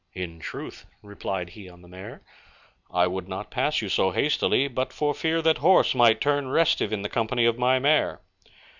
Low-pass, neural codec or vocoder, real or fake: 7.2 kHz; none; real